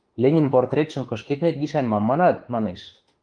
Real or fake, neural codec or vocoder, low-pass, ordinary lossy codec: fake; autoencoder, 48 kHz, 32 numbers a frame, DAC-VAE, trained on Japanese speech; 9.9 kHz; Opus, 24 kbps